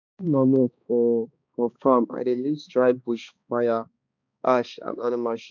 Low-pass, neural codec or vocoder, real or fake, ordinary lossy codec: 7.2 kHz; codec, 16 kHz, 2 kbps, X-Codec, HuBERT features, trained on balanced general audio; fake; none